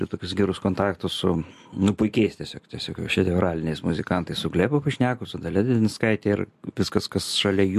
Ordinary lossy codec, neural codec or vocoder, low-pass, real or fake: MP3, 64 kbps; none; 14.4 kHz; real